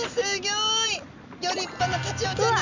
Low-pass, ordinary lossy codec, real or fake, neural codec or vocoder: 7.2 kHz; none; real; none